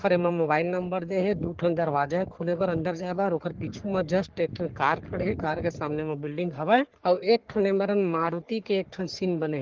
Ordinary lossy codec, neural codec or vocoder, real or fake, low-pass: Opus, 16 kbps; codec, 44.1 kHz, 3.4 kbps, Pupu-Codec; fake; 7.2 kHz